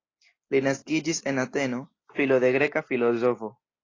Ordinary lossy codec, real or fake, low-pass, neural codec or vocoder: AAC, 32 kbps; real; 7.2 kHz; none